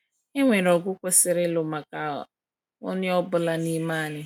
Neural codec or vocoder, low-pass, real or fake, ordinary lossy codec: none; 19.8 kHz; real; none